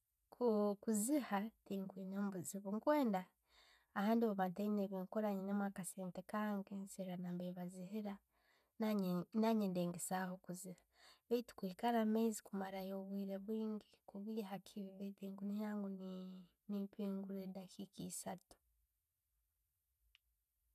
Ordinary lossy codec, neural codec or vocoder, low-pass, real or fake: none; none; 14.4 kHz; real